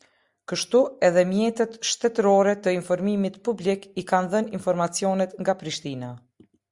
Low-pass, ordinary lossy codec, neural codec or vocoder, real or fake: 10.8 kHz; Opus, 64 kbps; none; real